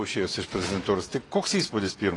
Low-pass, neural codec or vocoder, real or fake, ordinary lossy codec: 10.8 kHz; vocoder, 24 kHz, 100 mel bands, Vocos; fake; AAC, 32 kbps